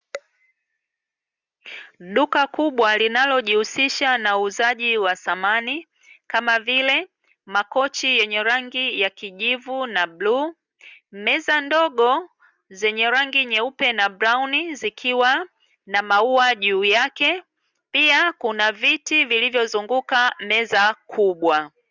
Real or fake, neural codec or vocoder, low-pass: real; none; 7.2 kHz